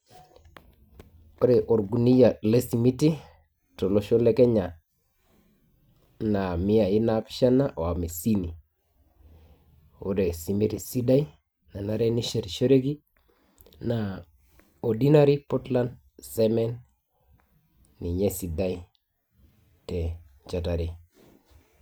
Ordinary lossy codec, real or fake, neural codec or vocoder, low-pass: none; real; none; none